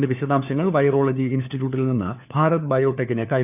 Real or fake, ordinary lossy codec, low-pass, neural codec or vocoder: fake; none; 3.6 kHz; codec, 16 kHz, 4 kbps, FunCodec, trained on LibriTTS, 50 frames a second